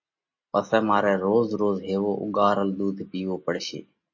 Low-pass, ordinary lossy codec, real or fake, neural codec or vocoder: 7.2 kHz; MP3, 32 kbps; real; none